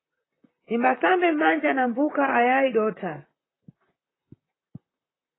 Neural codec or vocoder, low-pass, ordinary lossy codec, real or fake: vocoder, 44.1 kHz, 128 mel bands, Pupu-Vocoder; 7.2 kHz; AAC, 16 kbps; fake